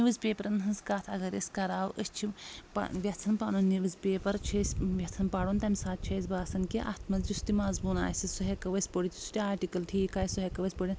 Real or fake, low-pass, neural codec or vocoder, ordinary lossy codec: real; none; none; none